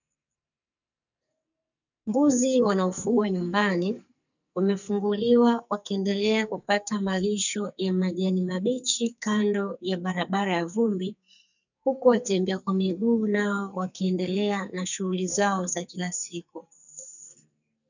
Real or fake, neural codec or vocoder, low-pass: fake; codec, 44.1 kHz, 2.6 kbps, SNAC; 7.2 kHz